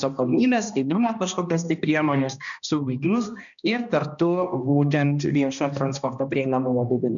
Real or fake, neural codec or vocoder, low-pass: fake; codec, 16 kHz, 1 kbps, X-Codec, HuBERT features, trained on balanced general audio; 7.2 kHz